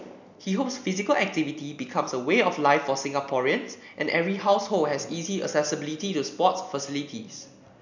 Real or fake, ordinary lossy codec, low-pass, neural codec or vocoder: real; none; 7.2 kHz; none